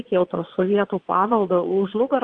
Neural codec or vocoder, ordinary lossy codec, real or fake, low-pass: vocoder, 22.05 kHz, 80 mel bands, Vocos; Opus, 16 kbps; fake; 9.9 kHz